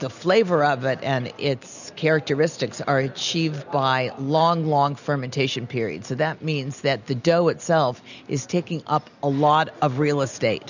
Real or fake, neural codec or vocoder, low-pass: real; none; 7.2 kHz